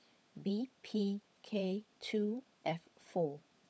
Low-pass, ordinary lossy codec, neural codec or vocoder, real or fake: none; none; codec, 16 kHz, 16 kbps, FunCodec, trained on LibriTTS, 50 frames a second; fake